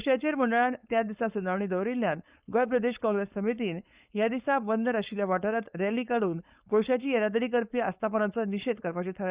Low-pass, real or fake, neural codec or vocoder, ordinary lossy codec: 3.6 kHz; fake; codec, 16 kHz, 4.8 kbps, FACodec; Opus, 64 kbps